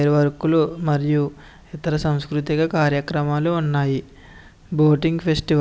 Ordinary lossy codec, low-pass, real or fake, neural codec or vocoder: none; none; real; none